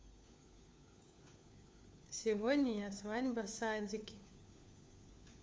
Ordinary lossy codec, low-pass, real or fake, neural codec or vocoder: none; none; fake; codec, 16 kHz, 4 kbps, FunCodec, trained on LibriTTS, 50 frames a second